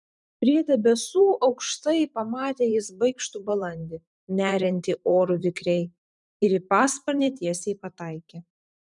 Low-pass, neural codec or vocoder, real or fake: 10.8 kHz; vocoder, 44.1 kHz, 128 mel bands every 512 samples, BigVGAN v2; fake